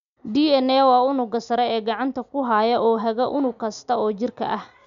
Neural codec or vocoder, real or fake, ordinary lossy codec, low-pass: none; real; none; 7.2 kHz